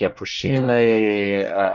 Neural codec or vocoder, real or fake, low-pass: codec, 24 kHz, 1 kbps, SNAC; fake; 7.2 kHz